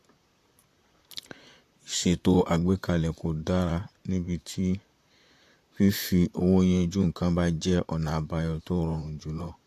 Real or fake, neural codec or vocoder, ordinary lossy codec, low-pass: fake; vocoder, 44.1 kHz, 128 mel bands, Pupu-Vocoder; AAC, 64 kbps; 14.4 kHz